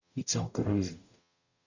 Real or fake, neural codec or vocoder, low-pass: fake; codec, 44.1 kHz, 0.9 kbps, DAC; 7.2 kHz